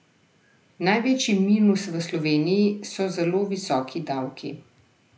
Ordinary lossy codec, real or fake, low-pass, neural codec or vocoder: none; real; none; none